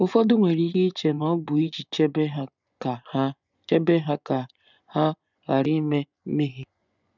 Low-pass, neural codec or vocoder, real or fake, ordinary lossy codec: 7.2 kHz; vocoder, 24 kHz, 100 mel bands, Vocos; fake; none